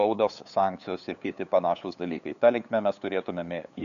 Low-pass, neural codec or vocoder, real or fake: 7.2 kHz; codec, 16 kHz, 8 kbps, FunCodec, trained on LibriTTS, 25 frames a second; fake